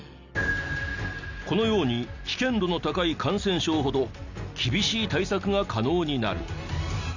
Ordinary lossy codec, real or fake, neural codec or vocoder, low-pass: none; real; none; 7.2 kHz